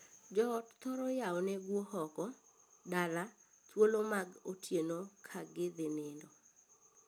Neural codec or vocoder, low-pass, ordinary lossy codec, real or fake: none; none; none; real